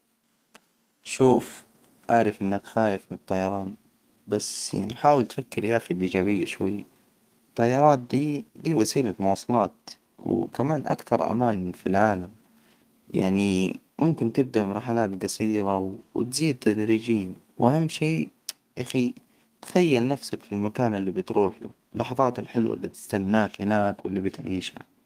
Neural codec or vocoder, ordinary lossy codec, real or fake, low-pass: codec, 32 kHz, 1.9 kbps, SNAC; Opus, 32 kbps; fake; 14.4 kHz